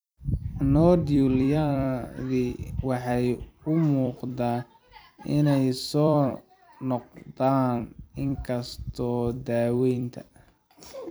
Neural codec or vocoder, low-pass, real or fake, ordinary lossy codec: vocoder, 44.1 kHz, 128 mel bands every 256 samples, BigVGAN v2; none; fake; none